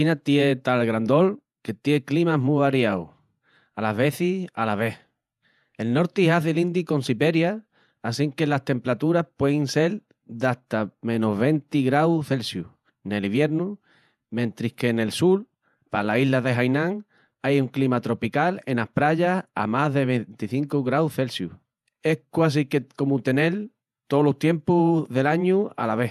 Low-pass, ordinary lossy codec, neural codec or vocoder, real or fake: 14.4 kHz; none; vocoder, 48 kHz, 128 mel bands, Vocos; fake